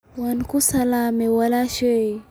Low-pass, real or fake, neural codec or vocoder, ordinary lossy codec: none; real; none; none